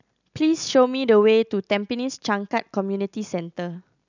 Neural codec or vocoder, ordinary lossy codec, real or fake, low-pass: none; none; real; 7.2 kHz